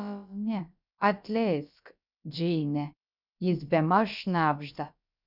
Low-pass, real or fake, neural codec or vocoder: 5.4 kHz; fake; codec, 16 kHz, about 1 kbps, DyCAST, with the encoder's durations